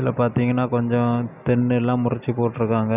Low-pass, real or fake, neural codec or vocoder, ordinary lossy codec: 3.6 kHz; real; none; none